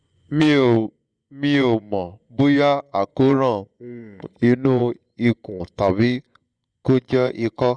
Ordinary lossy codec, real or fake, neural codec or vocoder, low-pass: Opus, 64 kbps; fake; vocoder, 24 kHz, 100 mel bands, Vocos; 9.9 kHz